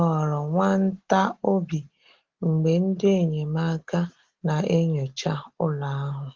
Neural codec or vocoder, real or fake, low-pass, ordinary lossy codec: none; real; 7.2 kHz; Opus, 16 kbps